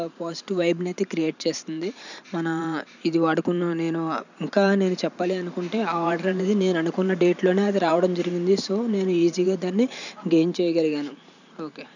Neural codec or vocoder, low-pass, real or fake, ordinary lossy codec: vocoder, 44.1 kHz, 128 mel bands every 512 samples, BigVGAN v2; 7.2 kHz; fake; none